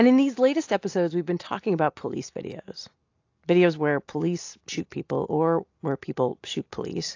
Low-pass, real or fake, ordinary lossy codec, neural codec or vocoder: 7.2 kHz; real; AAC, 48 kbps; none